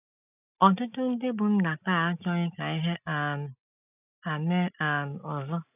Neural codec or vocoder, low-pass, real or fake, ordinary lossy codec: none; 3.6 kHz; real; none